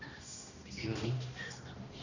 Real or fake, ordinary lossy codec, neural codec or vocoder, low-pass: fake; none; codec, 16 kHz, 1 kbps, X-Codec, HuBERT features, trained on balanced general audio; 7.2 kHz